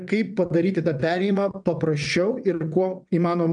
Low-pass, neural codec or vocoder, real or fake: 9.9 kHz; vocoder, 22.05 kHz, 80 mel bands, WaveNeXt; fake